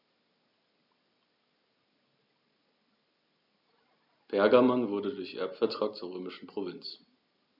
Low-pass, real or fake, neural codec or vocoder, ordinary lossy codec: 5.4 kHz; real; none; none